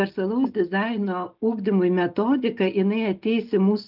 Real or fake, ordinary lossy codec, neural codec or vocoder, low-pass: real; Opus, 32 kbps; none; 5.4 kHz